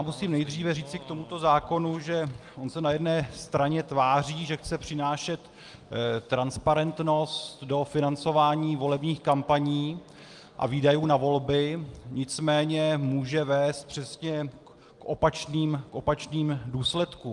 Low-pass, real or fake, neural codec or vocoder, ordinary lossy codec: 10.8 kHz; real; none; Opus, 32 kbps